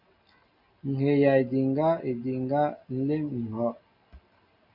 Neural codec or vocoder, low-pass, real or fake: none; 5.4 kHz; real